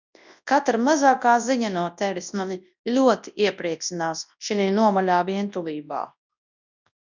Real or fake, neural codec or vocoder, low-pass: fake; codec, 24 kHz, 0.9 kbps, WavTokenizer, large speech release; 7.2 kHz